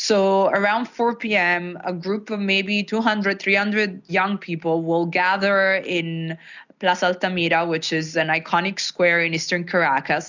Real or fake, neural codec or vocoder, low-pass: real; none; 7.2 kHz